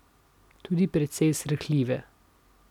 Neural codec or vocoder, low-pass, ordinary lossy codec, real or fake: none; 19.8 kHz; none; real